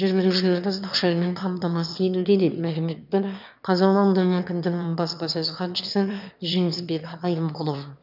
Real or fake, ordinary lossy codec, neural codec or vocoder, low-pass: fake; none; autoencoder, 22.05 kHz, a latent of 192 numbers a frame, VITS, trained on one speaker; 5.4 kHz